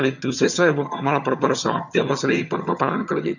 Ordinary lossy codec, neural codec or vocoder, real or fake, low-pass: none; vocoder, 22.05 kHz, 80 mel bands, HiFi-GAN; fake; 7.2 kHz